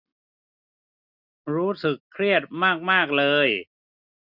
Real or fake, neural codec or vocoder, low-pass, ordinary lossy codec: real; none; 5.4 kHz; none